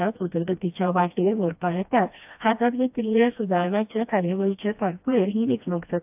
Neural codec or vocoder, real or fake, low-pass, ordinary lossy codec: codec, 16 kHz, 1 kbps, FreqCodec, smaller model; fake; 3.6 kHz; AAC, 32 kbps